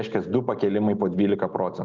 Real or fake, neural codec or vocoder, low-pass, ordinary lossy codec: real; none; 7.2 kHz; Opus, 32 kbps